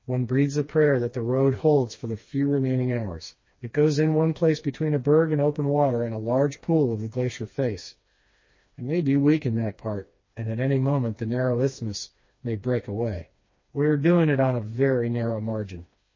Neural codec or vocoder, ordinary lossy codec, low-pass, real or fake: codec, 16 kHz, 2 kbps, FreqCodec, smaller model; MP3, 32 kbps; 7.2 kHz; fake